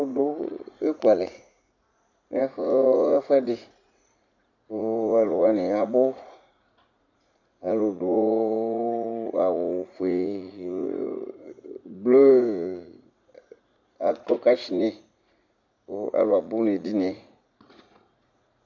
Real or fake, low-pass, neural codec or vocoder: fake; 7.2 kHz; vocoder, 44.1 kHz, 80 mel bands, Vocos